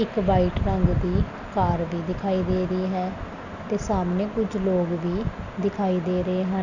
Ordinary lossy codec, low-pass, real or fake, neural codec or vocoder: none; 7.2 kHz; real; none